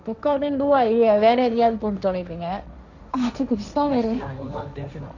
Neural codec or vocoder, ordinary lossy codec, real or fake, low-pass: codec, 16 kHz, 1.1 kbps, Voila-Tokenizer; none; fake; 7.2 kHz